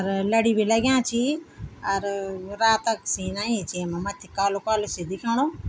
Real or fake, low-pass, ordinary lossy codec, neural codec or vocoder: real; none; none; none